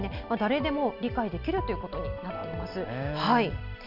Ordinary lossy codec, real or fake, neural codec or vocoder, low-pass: none; real; none; 5.4 kHz